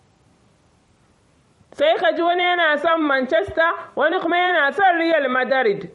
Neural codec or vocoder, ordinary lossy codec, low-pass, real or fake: vocoder, 44.1 kHz, 128 mel bands every 256 samples, BigVGAN v2; MP3, 48 kbps; 19.8 kHz; fake